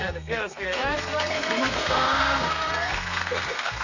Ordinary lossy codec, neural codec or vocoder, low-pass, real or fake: none; codec, 32 kHz, 1.9 kbps, SNAC; 7.2 kHz; fake